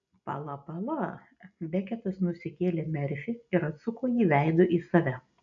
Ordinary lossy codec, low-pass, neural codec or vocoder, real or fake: MP3, 96 kbps; 7.2 kHz; none; real